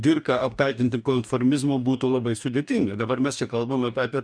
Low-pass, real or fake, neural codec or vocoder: 9.9 kHz; fake; codec, 44.1 kHz, 2.6 kbps, DAC